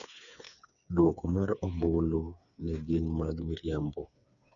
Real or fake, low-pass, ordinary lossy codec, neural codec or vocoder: fake; 7.2 kHz; none; codec, 16 kHz, 4 kbps, FreqCodec, smaller model